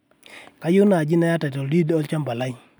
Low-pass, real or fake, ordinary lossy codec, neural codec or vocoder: none; real; none; none